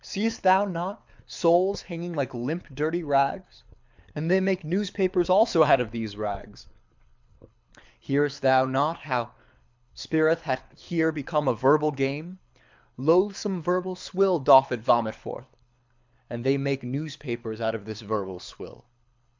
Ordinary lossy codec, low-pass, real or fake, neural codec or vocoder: MP3, 64 kbps; 7.2 kHz; fake; codec, 24 kHz, 6 kbps, HILCodec